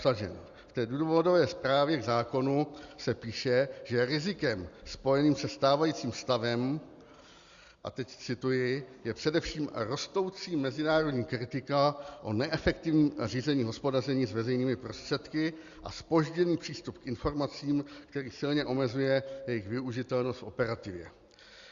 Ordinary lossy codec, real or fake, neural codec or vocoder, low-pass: Opus, 64 kbps; real; none; 7.2 kHz